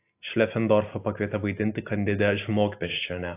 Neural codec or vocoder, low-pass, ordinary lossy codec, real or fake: none; 3.6 kHz; AAC, 24 kbps; real